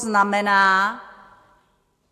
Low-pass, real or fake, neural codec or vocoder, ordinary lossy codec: 14.4 kHz; real; none; AAC, 64 kbps